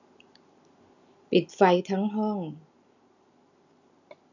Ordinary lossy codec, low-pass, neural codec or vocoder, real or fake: none; 7.2 kHz; none; real